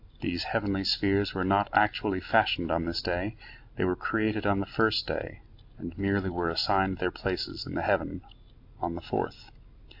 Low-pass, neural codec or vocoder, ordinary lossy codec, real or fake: 5.4 kHz; none; AAC, 48 kbps; real